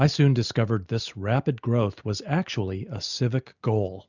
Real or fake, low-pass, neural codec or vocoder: real; 7.2 kHz; none